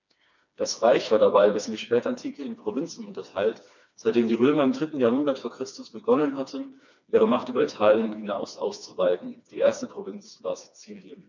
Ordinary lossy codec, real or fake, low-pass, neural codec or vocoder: none; fake; 7.2 kHz; codec, 16 kHz, 2 kbps, FreqCodec, smaller model